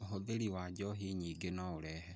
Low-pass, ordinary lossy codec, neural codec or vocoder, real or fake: none; none; none; real